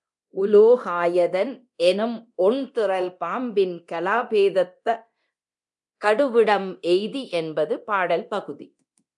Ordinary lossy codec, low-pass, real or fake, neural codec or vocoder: MP3, 96 kbps; 10.8 kHz; fake; codec, 24 kHz, 0.9 kbps, DualCodec